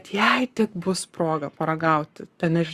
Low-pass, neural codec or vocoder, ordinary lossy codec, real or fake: 14.4 kHz; codec, 44.1 kHz, 7.8 kbps, Pupu-Codec; Opus, 64 kbps; fake